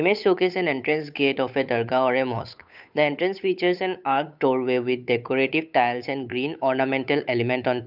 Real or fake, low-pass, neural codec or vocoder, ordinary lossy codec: fake; 5.4 kHz; codec, 16 kHz, 8 kbps, FunCodec, trained on Chinese and English, 25 frames a second; none